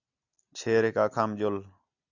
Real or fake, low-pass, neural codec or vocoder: real; 7.2 kHz; none